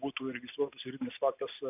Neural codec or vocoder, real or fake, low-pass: none; real; 3.6 kHz